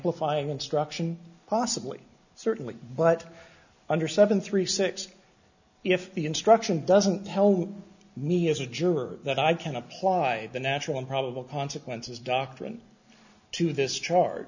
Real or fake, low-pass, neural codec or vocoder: real; 7.2 kHz; none